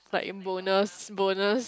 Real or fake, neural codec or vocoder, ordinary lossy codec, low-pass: real; none; none; none